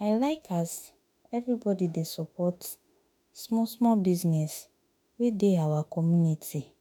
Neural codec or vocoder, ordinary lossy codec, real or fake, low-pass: autoencoder, 48 kHz, 32 numbers a frame, DAC-VAE, trained on Japanese speech; none; fake; none